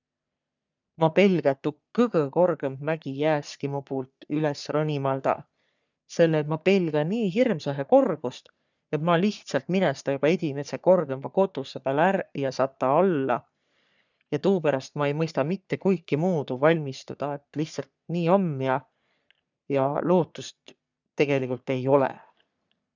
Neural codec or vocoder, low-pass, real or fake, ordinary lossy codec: codec, 44.1 kHz, 3.4 kbps, Pupu-Codec; 7.2 kHz; fake; none